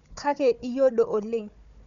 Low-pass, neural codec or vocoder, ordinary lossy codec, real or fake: 7.2 kHz; codec, 16 kHz, 4 kbps, FunCodec, trained on Chinese and English, 50 frames a second; none; fake